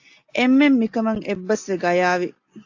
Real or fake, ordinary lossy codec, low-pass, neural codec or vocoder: real; AAC, 48 kbps; 7.2 kHz; none